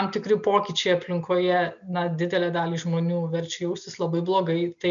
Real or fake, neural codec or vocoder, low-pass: real; none; 7.2 kHz